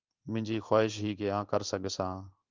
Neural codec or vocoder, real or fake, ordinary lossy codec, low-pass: codec, 16 kHz in and 24 kHz out, 1 kbps, XY-Tokenizer; fake; Opus, 32 kbps; 7.2 kHz